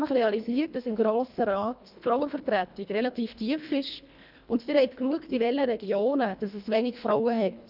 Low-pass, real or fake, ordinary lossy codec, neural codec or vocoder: 5.4 kHz; fake; none; codec, 24 kHz, 1.5 kbps, HILCodec